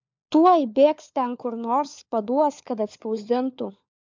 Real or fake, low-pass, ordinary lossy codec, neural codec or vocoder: fake; 7.2 kHz; MP3, 64 kbps; codec, 16 kHz, 4 kbps, FunCodec, trained on LibriTTS, 50 frames a second